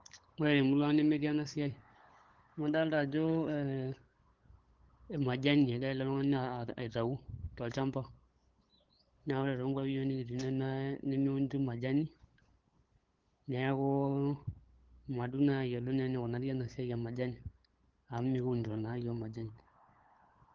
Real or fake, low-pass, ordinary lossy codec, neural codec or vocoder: fake; 7.2 kHz; Opus, 16 kbps; codec, 16 kHz, 8 kbps, FunCodec, trained on LibriTTS, 25 frames a second